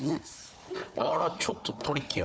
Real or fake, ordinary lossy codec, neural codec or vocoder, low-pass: fake; none; codec, 16 kHz, 4 kbps, FunCodec, trained on Chinese and English, 50 frames a second; none